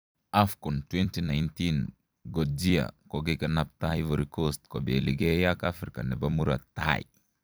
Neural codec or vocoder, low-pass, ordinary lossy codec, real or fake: vocoder, 44.1 kHz, 128 mel bands every 256 samples, BigVGAN v2; none; none; fake